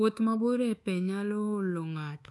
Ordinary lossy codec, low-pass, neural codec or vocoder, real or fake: none; none; codec, 24 kHz, 1.2 kbps, DualCodec; fake